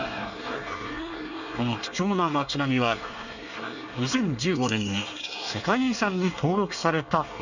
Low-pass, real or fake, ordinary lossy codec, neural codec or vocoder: 7.2 kHz; fake; none; codec, 24 kHz, 1 kbps, SNAC